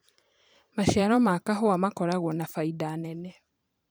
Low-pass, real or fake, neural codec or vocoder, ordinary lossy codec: none; fake; vocoder, 44.1 kHz, 128 mel bands every 512 samples, BigVGAN v2; none